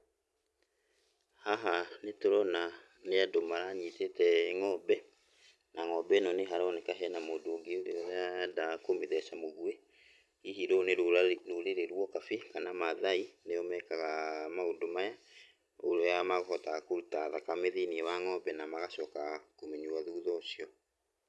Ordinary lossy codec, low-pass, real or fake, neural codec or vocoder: none; none; real; none